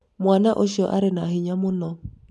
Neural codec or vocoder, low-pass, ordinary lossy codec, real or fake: none; none; none; real